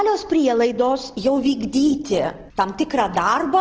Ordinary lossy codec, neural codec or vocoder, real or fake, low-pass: Opus, 16 kbps; none; real; 7.2 kHz